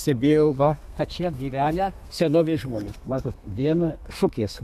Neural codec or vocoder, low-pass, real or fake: codec, 32 kHz, 1.9 kbps, SNAC; 14.4 kHz; fake